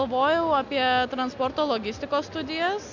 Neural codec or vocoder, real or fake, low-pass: none; real; 7.2 kHz